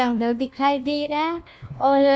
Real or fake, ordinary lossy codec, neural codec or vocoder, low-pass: fake; none; codec, 16 kHz, 1 kbps, FunCodec, trained on LibriTTS, 50 frames a second; none